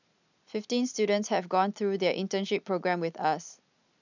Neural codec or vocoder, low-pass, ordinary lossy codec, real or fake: none; 7.2 kHz; none; real